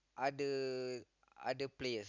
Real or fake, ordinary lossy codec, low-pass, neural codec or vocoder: real; none; 7.2 kHz; none